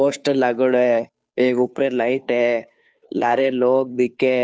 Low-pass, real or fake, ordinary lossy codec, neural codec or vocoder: none; fake; none; codec, 16 kHz, 2 kbps, FunCodec, trained on Chinese and English, 25 frames a second